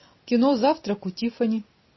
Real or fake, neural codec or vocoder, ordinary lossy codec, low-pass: real; none; MP3, 24 kbps; 7.2 kHz